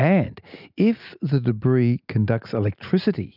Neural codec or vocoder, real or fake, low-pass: none; real; 5.4 kHz